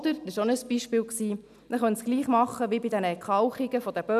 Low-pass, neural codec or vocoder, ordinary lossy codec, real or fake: 14.4 kHz; none; none; real